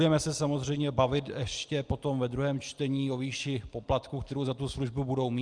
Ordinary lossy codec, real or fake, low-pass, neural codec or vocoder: Opus, 32 kbps; real; 9.9 kHz; none